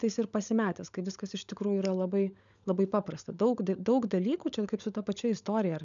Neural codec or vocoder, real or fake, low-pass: codec, 16 kHz, 16 kbps, FunCodec, trained on LibriTTS, 50 frames a second; fake; 7.2 kHz